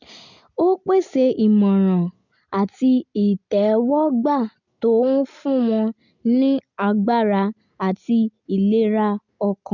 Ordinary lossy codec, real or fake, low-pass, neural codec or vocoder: none; real; 7.2 kHz; none